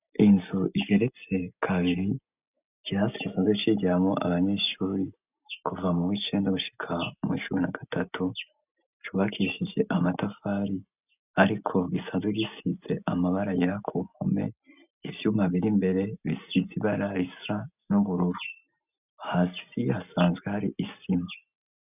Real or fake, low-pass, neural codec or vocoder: real; 3.6 kHz; none